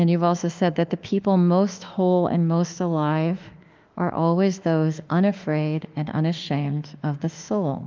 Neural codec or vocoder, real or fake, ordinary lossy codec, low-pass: autoencoder, 48 kHz, 32 numbers a frame, DAC-VAE, trained on Japanese speech; fake; Opus, 24 kbps; 7.2 kHz